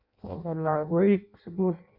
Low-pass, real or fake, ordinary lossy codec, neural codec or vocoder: 5.4 kHz; fake; none; codec, 16 kHz in and 24 kHz out, 0.6 kbps, FireRedTTS-2 codec